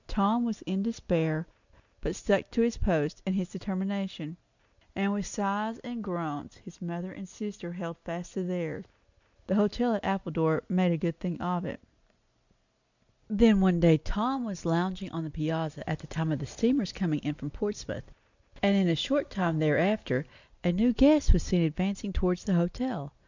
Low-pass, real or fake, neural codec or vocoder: 7.2 kHz; real; none